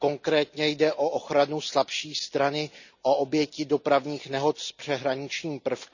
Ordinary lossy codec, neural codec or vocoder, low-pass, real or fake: none; none; 7.2 kHz; real